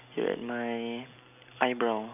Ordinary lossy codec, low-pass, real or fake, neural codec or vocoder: none; 3.6 kHz; real; none